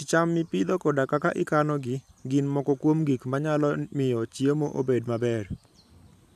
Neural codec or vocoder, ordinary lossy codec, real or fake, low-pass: vocoder, 44.1 kHz, 128 mel bands every 512 samples, BigVGAN v2; none; fake; 14.4 kHz